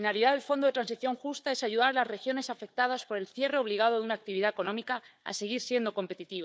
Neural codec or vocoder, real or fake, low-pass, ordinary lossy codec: codec, 16 kHz, 4 kbps, FunCodec, trained on Chinese and English, 50 frames a second; fake; none; none